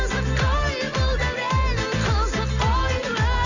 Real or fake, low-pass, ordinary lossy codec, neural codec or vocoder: real; 7.2 kHz; none; none